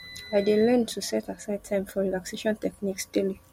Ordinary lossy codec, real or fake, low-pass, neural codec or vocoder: MP3, 64 kbps; real; 19.8 kHz; none